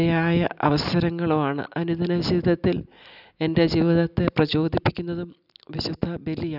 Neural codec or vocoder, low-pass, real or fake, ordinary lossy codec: none; 5.4 kHz; real; none